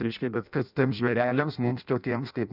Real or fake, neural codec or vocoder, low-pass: fake; codec, 16 kHz in and 24 kHz out, 0.6 kbps, FireRedTTS-2 codec; 5.4 kHz